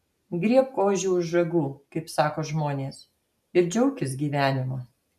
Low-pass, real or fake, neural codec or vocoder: 14.4 kHz; real; none